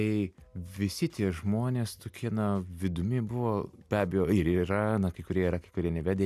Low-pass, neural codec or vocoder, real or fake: 14.4 kHz; none; real